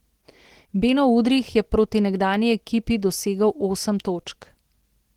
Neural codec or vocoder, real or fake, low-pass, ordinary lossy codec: none; real; 19.8 kHz; Opus, 16 kbps